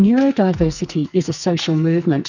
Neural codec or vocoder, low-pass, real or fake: codec, 44.1 kHz, 2.6 kbps, SNAC; 7.2 kHz; fake